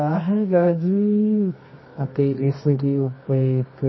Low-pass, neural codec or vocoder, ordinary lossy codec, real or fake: 7.2 kHz; codec, 24 kHz, 0.9 kbps, WavTokenizer, medium music audio release; MP3, 24 kbps; fake